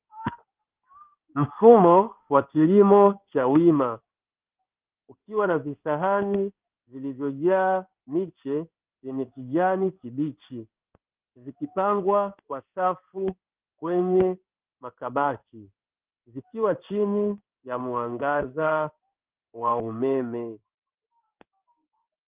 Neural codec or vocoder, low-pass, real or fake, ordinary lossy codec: codec, 16 kHz in and 24 kHz out, 1 kbps, XY-Tokenizer; 3.6 kHz; fake; Opus, 32 kbps